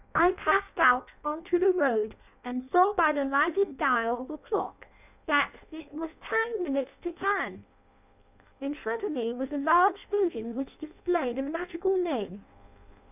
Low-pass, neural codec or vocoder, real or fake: 3.6 kHz; codec, 16 kHz in and 24 kHz out, 0.6 kbps, FireRedTTS-2 codec; fake